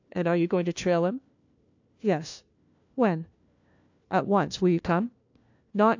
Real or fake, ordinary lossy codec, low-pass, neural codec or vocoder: fake; AAC, 48 kbps; 7.2 kHz; codec, 16 kHz, 1 kbps, FunCodec, trained on LibriTTS, 50 frames a second